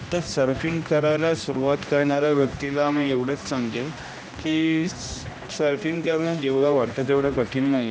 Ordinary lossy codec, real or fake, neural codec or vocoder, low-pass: none; fake; codec, 16 kHz, 1 kbps, X-Codec, HuBERT features, trained on general audio; none